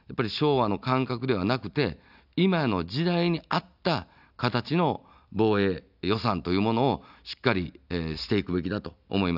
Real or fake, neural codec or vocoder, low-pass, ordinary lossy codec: real; none; 5.4 kHz; none